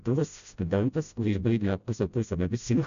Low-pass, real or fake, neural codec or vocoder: 7.2 kHz; fake; codec, 16 kHz, 0.5 kbps, FreqCodec, smaller model